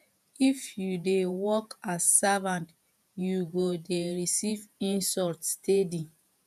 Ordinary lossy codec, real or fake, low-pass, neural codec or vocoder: none; fake; 14.4 kHz; vocoder, 48 kHz, 128 mel bands, Vocos